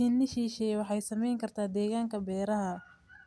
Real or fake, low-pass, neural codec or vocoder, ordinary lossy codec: real; none; none; none